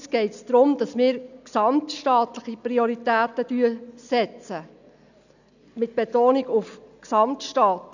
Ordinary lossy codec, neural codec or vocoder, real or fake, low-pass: none; none; real; 7.2 kHz